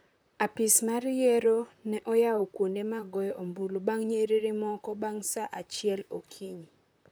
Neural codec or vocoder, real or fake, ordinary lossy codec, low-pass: vocoder, 44.1 kHz, 128 mel bands, Pupu-Vocoder; fake; none; none